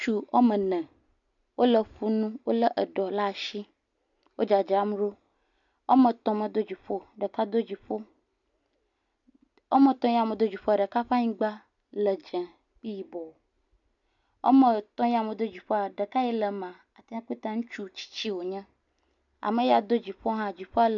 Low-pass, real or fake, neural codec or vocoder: 7.2 kHz; real; none